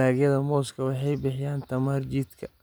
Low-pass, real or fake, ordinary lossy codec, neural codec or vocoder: none; real; none; none